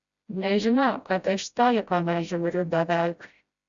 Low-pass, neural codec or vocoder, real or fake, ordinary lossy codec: 7.2 kHz; codec, 16 kHz, 0.5 kbps, FreqCodec, smaller model; fake; Opus, 64 kbps